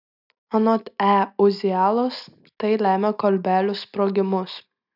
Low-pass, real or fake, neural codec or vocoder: 5.4 kHz; real; none